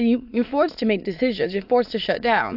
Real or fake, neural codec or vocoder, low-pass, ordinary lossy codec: fake; autoencoder, 22.05 kHz, a latent of 192 numbers a frame, VITS, trained on many speakers; 5.4 kHz; AAC, 48 kbps